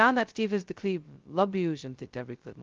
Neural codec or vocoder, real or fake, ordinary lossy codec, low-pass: codec, 16 kHz, 0.2 kbps, FocalCodec; fake; Opus, 32 kbps; 7.2 kHz